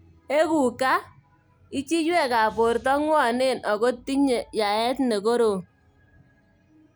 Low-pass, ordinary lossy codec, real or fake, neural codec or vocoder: none; none; real; none